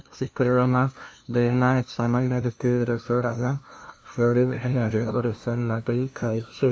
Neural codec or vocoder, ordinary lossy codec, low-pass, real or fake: codec, 16 kHz, 0.5 kbps, FunCodec, trained on LibriTTS, 25 frames a second; none; none; fake